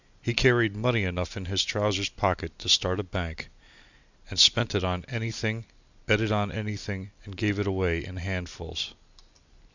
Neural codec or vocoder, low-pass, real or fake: none; 7.2 kHz; real